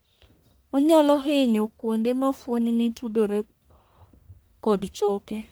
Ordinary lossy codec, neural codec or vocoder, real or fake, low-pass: none; codec, 44.1 kHz, 1.7 kbps, Pupu-Codec; fake; none